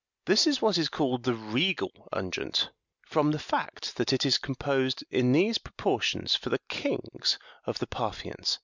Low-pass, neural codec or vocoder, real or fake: 7.2 kHz; none; real